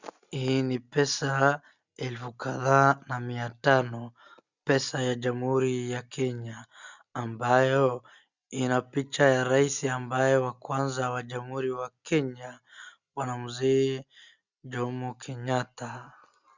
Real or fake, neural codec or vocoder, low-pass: real; none; 7.2 kHz